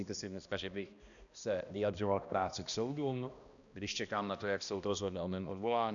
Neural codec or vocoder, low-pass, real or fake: codec, 16 kHz, 1 kbps, X-Codec, HuBERT features, trained on balanced general audio; 7.2 kHz; fake